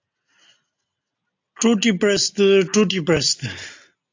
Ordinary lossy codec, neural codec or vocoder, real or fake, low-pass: AAC, 48 kbps; none; real; 7.2 kHz